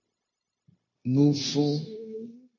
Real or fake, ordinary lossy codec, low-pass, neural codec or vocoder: fake; MP3, 32 kbps; 7.2 kHz; codec, 16 kHz, 0.9 kbps, LongCat-Audio-Codec